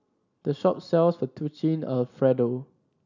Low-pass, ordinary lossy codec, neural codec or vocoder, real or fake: 7.2 kHz; none; none; real